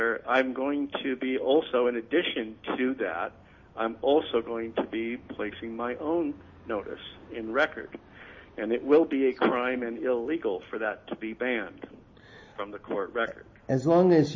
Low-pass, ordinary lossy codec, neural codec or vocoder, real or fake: 7.2 kHz; MP3, 32 kbps; none; real